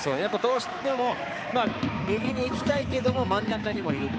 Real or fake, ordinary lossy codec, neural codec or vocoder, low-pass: fake; none; codec, 16 kHz, 4 kbps, X-Codec, HuBERT features, trained on balanced general audio; none